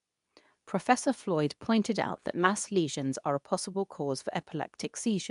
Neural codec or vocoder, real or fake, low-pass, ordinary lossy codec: codec, 24 kHz, 0.9 kbps, WavTokenizer, medium speech release version 2; fake; 10.8 kHz; none